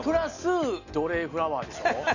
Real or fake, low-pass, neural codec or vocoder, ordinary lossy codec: real; 7.2 kHz; none; none